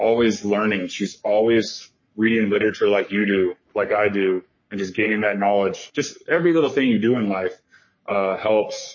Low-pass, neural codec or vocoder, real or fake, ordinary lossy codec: 7.2 kHz; codec, 44.1 kHz, 3.4 kbps, Pupu-Codec; fake; MP3, 32 kbps